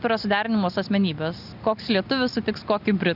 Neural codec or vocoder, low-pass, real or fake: none; 5.4 kHz; real